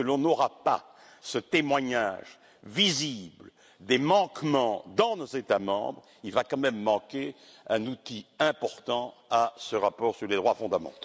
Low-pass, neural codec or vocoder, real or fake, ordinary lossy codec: none; none; real; none